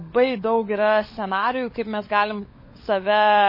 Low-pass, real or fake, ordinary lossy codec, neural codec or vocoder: 5.4 kHz; fake; MP3, 24 kbps; codec, 16 kHz, 16 kbps, FunCodec, trained on LibriTTS, 50 frames a second